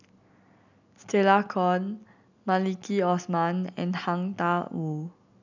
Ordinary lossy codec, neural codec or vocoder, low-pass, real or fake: none; none; 7.2 kHz; real